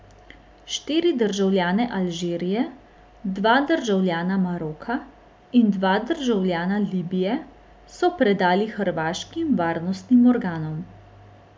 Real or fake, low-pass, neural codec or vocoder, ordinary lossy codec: real; none; none; none